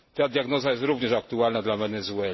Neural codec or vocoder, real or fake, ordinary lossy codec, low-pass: none; real; MP3, 24 kbps; 7.2 kHz